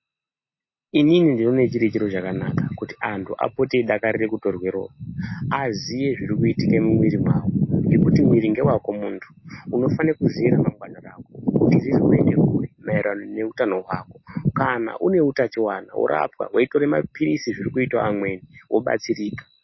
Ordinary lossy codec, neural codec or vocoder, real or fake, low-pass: MP3, 24 kbps; none; real; 7.2 kHz